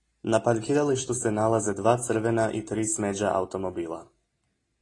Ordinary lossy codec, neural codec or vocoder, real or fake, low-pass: AAC, 32 kbps; none; real; 10.8 kHz